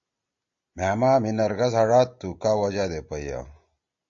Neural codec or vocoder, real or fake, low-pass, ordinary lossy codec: none; real; 7.2 kHz; AAC, 64 kbps